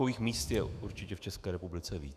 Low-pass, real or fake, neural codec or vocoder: 14.4 kHz; fake; autoencoder, 48 kHz, 128 numbers a frame, DAC-VAE, trained on Japanese speech